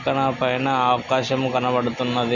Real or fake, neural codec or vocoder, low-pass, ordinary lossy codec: real; none; 7.2 kHz; none